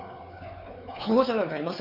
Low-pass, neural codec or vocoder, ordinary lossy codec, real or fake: 5.4 kHz; codec, 16 kHz, 4 kbps, X-Codec, WavLM features, trained on Multilingual LibriSpeech; none; fake